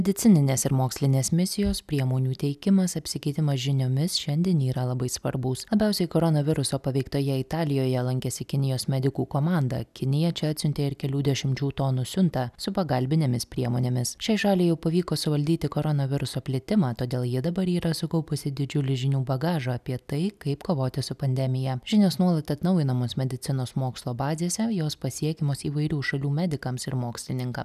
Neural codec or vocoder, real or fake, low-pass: none; real; 14.4 kHz